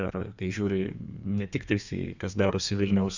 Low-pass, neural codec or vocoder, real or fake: 7.2 kHz; codec, 32 kHz, 1.9 kbps, SNAC; fake